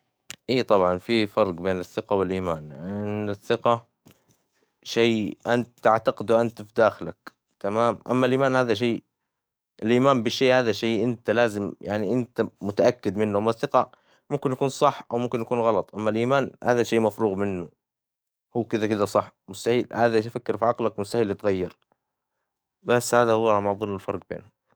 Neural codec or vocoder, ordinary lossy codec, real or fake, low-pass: codec, 44.1 kHz, 7.8 kbps, DAC; none; fake; none